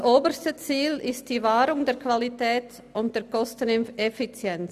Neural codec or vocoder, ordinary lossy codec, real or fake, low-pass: none; none; real; 14.4 kHz